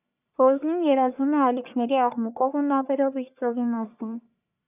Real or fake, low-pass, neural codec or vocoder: fake; 3.6 kHz; codec, 44.1 kHz, 1.7 kbps, Pupu-Codec